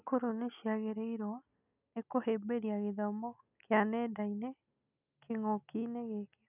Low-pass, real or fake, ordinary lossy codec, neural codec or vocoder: 3.6 kHz; real; none; none